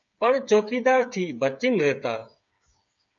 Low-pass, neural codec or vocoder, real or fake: 7.2 kHz; codec, 16 kHz, 8 kbps, FreqCodec, smaller model; fake